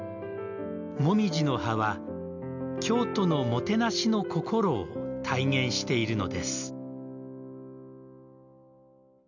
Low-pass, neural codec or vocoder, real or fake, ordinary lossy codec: 7.2 kHz; none; real; none